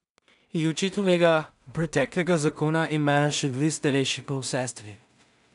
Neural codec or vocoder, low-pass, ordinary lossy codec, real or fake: codec, 16 kHz in and 24 kHz out, 0.4 kbps, LongCat-Audio-Codec, two codebook decoder; 10.8 kHz; none; fake